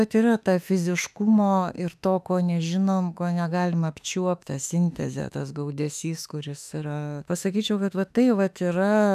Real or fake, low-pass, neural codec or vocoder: fake; 14.4 kHz; autoencoder, 48 kHz, 32 numbers a frame, DAC-VAE, trained on Japanese speech